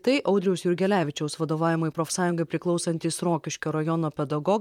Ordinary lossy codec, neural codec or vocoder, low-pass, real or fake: MP3, 96 kbps; vocoder, 44.1 kHz, 128 mel bands, Pupu-Vocoder; 19.8 kHz; fake